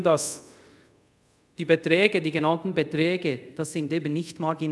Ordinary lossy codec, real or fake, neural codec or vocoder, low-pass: none; fake; codec, 24 kHz, 0.5 kbps, DualCodec; none